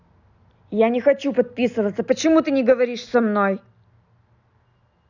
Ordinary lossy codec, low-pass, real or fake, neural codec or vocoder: none; 7.2 kHz; real; none